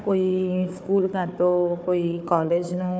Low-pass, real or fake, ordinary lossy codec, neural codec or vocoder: none; fake; none; codec, 16 kHz, 4 kbps, FunCodec, trained on Chinese and English, 50 frames a second